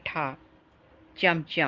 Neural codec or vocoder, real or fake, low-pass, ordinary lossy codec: none; real; 7.2 kHz; Opus, 16 kbps